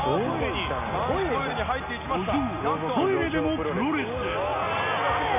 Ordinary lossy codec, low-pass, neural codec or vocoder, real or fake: none; 3.6 kHz; none; real